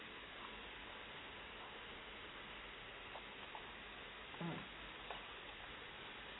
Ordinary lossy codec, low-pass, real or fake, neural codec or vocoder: AAC, 16 kbps; 7.2 kHz; real; none